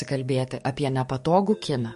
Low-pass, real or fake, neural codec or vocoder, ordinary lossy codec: 14.4 kHz; fake; codec, 44.1 kHz, 7.8 kbps, DAC; MP3, 48 kbps